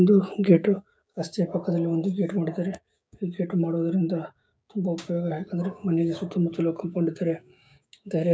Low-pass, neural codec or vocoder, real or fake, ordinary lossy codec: none; codec, 16 kHz, 6 kbps, DAC; fake; none